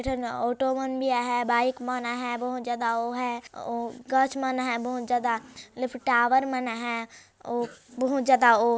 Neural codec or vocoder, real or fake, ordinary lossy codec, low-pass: none; real; none; none